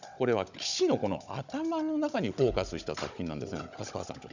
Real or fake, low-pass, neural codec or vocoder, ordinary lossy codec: fake; 7.2 kHz; codec, 16 kHz, 16 kbps, FunCodec, trained on Chinese and English, 50 frames a second; none